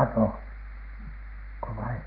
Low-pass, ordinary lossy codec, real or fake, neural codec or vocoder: 5.4 kHz; Opus, 32 kbps; real; none